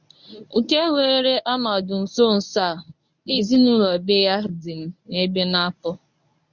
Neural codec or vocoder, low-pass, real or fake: codec, 24 kHz, 0.9 kbps, WavTokenizer, medium speech release version 1; 7.2 kHz; fake